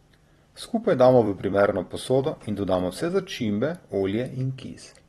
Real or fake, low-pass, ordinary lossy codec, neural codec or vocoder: fake; 19.8 kHz; AAC, 32 kbps; vocoder, 44.1 kHz, 128 mel bands every 256 samples, BigVGAN v2